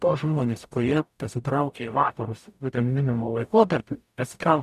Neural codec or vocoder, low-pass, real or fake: codec, 44.1 kHz, 0.9 kbps, DAC; 14.4 kHz; fake